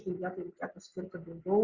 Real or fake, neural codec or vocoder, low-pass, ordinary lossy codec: real; none; 7.2 kHz; Opus, 64 kbps